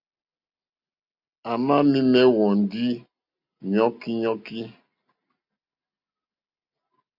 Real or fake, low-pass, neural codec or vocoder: real; 5.4 kHz; none